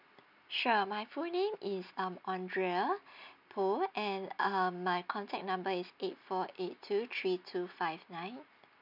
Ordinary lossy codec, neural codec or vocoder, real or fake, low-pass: none; none; real; 5.4 kHz